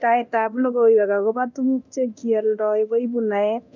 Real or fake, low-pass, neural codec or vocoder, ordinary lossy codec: fake; 7.2 kHz; codec, 24 kHz, 0.9 kbps, DualCodec; none